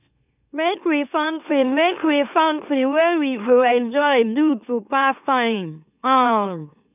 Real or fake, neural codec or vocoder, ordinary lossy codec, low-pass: fake; autoencoder, 44.1 kHz, a latent of 192 numbers a frame, MeloTTS; none; 3.6 kHz